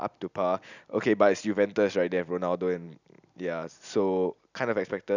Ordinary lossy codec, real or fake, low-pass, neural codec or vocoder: none; real; 7.2 kHz; none